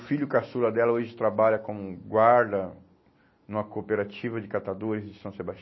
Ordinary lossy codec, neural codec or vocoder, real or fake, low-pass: MP3, 24 kbps; none; real; 7.2 kHz